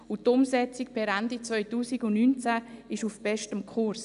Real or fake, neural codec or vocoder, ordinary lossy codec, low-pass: real; none; none; 10.8 kHz